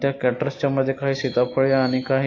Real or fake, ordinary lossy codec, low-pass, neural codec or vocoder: real; AAC, 32 kbps; 7.2 kHz; none